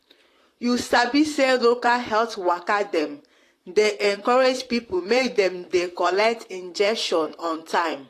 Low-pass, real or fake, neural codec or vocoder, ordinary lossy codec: 14.4 kHz; fake; vocoder, 44.1 kHz, 128 mel bands, Pupu-Vocoder; AAC, 48 kbps